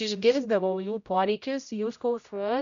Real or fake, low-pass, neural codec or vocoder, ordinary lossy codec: fake; 7.2 kHz; codec, 16 kHz, 0.5 kbps, X-Codec, HuBERT features, trained on general audio; MP3, 96 kbps